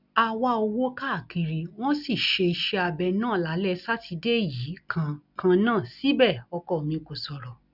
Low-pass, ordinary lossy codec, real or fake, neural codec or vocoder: 5.4 kHz; none; real; none